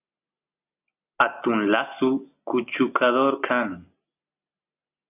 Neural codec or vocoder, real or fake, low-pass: none; real; 3.6 kHz